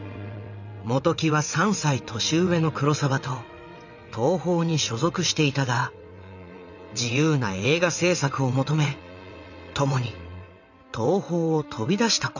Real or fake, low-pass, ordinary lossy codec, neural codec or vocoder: fake; 7.2 kHz; none; vocoder, 22.05 kHz, 80 mel bands, WaveNeXt